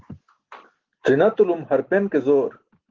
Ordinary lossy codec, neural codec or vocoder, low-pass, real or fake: Opus, 16 kbps; none; 7.2 kHz; real